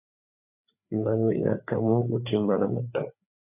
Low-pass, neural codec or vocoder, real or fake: 3.6 kHz; codec, 16 kHz, 4 kbps, FreqCodec, larger model; fake